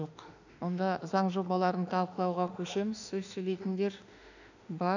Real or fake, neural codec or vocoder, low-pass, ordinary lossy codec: fake; autoencoder, 48 kHz, 32 numbers a frame, DAC-VAE, trained on Japanese speech; 7.2 kHz; none